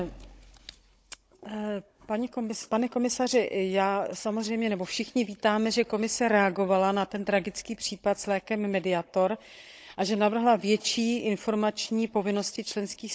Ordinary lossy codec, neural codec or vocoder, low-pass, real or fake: none; codec, 16 kHz, 16 kbps, FunCodec, trained on Chinese and English, 50 frames a second; none; fake